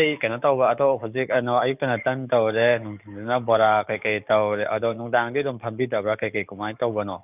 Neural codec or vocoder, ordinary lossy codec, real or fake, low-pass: codec, 44.1 kHz, 7.8 kbps, DAC; none; fake; 3.6 kHz